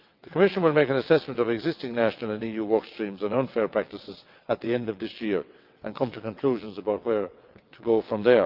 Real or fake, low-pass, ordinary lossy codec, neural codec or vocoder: fake; 5.4 kHz; Opus, 24 kbps; vocoder, 22.05 kHz, 80 mel bands, WaveNeXt